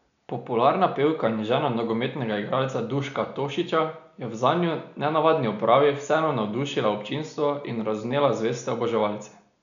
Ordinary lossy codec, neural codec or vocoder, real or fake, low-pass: none; none; real; 7.2 kHz